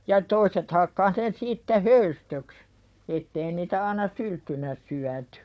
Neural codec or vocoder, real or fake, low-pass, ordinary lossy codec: codec, 16 kHz, 4 kbps, FunCodec, trained on Chinese and English, 50 frames a second; fake; none; none